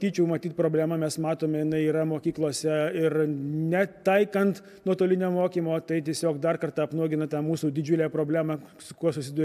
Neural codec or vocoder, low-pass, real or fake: none; 14.4 kHz; real